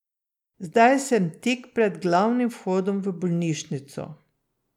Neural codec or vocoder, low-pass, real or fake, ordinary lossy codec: none; 19.8 kHz; real; none